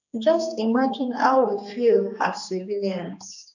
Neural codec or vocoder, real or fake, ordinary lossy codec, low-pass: codec, 16 kHz, 2 kbps, X-Codec, HuBERT features, trained on general audio; fake; none; 7.2 kHz